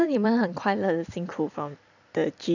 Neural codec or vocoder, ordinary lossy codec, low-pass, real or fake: vocoder, 44.1 kHz, 128 mel bands every 256 samples, BigVGAN v2; none; 7.2 kHz; fake